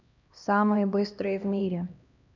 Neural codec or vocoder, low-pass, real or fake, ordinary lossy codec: codec, 16 kHz, 1 kbps, X-Codec, HuBERT features, trained on LibriSpeech; 7.2 kHz; fake; none